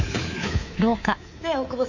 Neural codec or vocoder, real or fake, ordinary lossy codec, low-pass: codec, 24 kHz, 3.1 kbps, DualCodec; fake; Opus, 64 kbps; 7.2 kHz